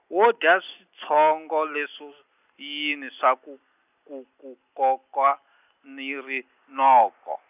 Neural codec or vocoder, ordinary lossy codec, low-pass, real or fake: none; none; 3.6 kHz; real